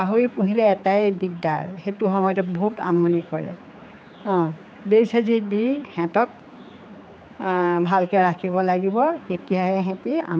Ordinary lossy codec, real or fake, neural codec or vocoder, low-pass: none; fake; codec, 16 kHz, 4 kbps, X-Codec, HuBERT features, trained on general audio; none